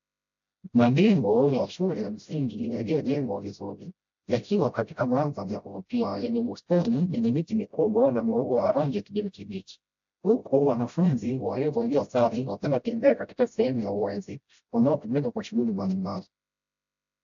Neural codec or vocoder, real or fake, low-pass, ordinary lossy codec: codec, 16 kHz, 0.5 kbps, FreqCodec, smaller model; fake; 7.2 kHz; AAC, 64 kbps